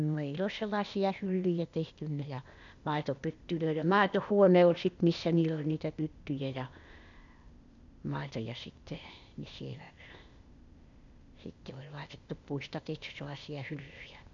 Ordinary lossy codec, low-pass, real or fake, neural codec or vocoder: none; 7.2 kHz; fake; codec, 16 kHz, 0.8 kbps, ZipCodec